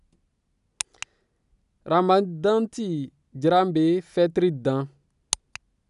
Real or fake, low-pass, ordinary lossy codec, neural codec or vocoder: real; 10.8 kHz; none; none